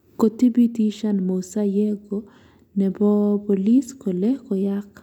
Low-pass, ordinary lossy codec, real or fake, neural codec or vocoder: 19.8 kHz; none; real; none